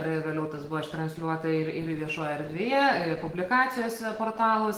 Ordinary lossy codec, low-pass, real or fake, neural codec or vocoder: Opus, 16 kbps; 19.8 kHz; real; none